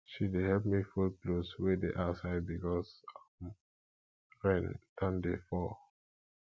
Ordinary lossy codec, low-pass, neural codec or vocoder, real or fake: none; none; none; real